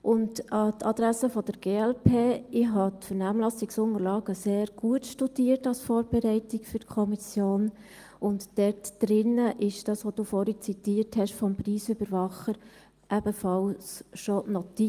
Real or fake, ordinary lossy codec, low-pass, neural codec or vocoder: real; Opus, 32 kbps; 14.4 kHz; none